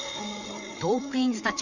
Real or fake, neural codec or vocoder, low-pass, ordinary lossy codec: fake; codec, 16 kHz, 8 kbps, FreqCodec, larger model; 7.2 kHz; none